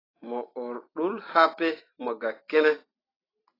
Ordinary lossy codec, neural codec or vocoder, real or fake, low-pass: AAC, 24 kbps; none; real; 5.4 kHz